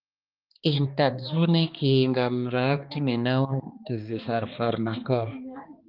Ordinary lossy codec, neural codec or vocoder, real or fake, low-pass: Opus, 24 kbps; codec, 16 kHz, 2 kbps, X-Codec, HuBERT features, trained on balanced general audio; fake; 5.4 kHz